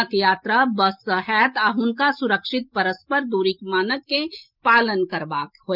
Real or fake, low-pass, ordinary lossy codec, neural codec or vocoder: real; 5.4 kHz; Opus, 24 kbps; none